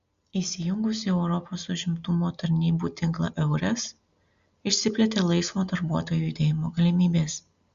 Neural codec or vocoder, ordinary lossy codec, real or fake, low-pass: none; Opus, 64 kbps; real; 7.2 kHz